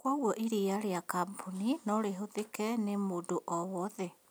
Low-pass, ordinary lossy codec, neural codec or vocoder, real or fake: none; none; none; real